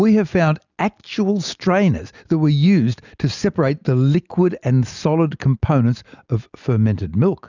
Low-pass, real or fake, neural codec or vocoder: 7.2 kHz; real; none